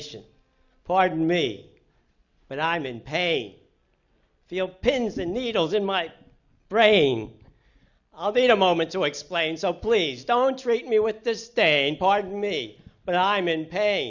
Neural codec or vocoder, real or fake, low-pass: none; real; 7.2 kHz